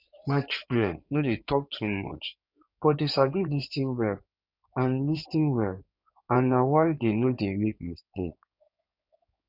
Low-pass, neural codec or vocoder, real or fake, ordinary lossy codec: 5.4 kHz; codec, 16 kHz in and 24 kHz out, 2.2 kbps, FireRedTTS-2 codec; fake; none